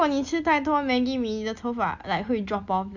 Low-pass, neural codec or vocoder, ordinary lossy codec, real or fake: 7.2 kHz; none; Opus, 64 kbps; real